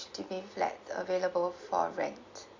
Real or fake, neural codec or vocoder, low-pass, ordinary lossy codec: real; none; 7.2 kHz; MP3, 64 kbps